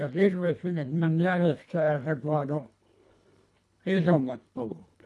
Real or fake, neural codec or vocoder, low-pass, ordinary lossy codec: fake; codec, 24 kHz, 1.5 kbps, HILCodec; none; none